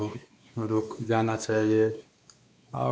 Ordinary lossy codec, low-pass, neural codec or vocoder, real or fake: none; none; codec, 16 kHz, 2 kbps, X-Codec, WavLM features, trained on Multilingual LibriSpeech; fake